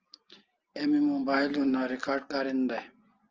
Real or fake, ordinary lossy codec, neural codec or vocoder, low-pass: real; Opus, 24 kbps; none; 7.2 kHz